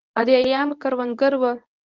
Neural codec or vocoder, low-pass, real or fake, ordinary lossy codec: codec, 24 kHz, 0.9 kbps, WavTokenizer, medium speech release version 1; 7.2 kHz; fake; Opus, 24 kbps